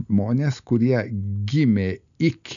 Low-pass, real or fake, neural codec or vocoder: 7.2 kHz; real; none